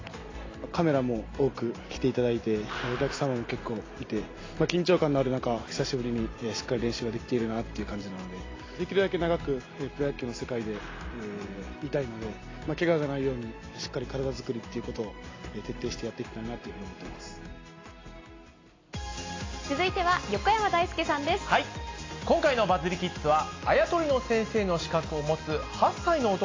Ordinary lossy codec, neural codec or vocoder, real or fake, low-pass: AAC, 32 kbps; none; real; 7.2 kHz